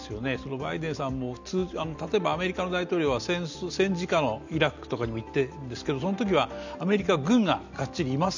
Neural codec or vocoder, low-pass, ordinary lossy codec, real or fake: none; 7.2 kHz; none; real